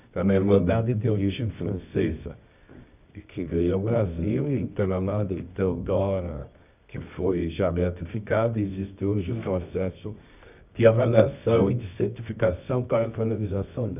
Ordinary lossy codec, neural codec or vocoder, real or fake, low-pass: none; codec, 24 kHz, 0.9 kbps, WavTokenizer, medium music audio release; fake; 3.6 kHz